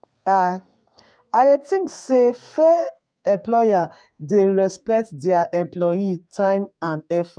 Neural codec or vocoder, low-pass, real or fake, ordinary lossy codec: codec, 32 kHz, 1.9 kbps, SNAC; 9.9 kHz; fake; none